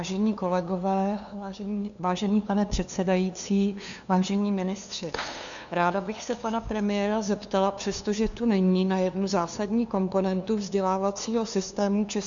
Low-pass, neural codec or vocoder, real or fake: 7.2 kHz; codec, 16 kHz, 2 kbps, FunCodec, trained on LibriTTS, 25 frames a second; fake